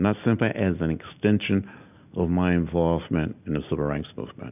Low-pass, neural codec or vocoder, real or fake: 3.6 kHz; none; real